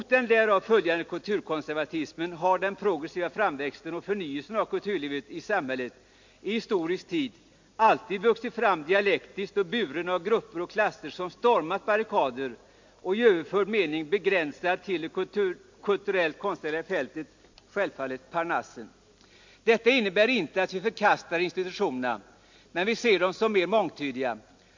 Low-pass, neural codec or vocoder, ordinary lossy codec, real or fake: 7.2 kHz; none; MP3, 48 kbps; real